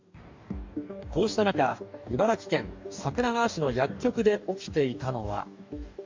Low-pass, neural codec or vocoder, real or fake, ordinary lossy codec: 7.2 kHz; codec, 44.1 kHz, 2.6 kbps, DAC; fake; MP3, 64 kbps